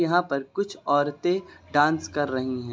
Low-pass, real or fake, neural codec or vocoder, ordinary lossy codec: none; real; none; none